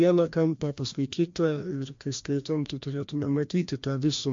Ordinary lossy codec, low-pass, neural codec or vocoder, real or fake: MP3, 48 kbps; 7.2 kHz; codec, 16 kHz, 1 kbps, FreqCodec, larger model; fake